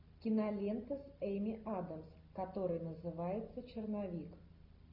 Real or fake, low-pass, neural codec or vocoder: real; 5.4 kHz; none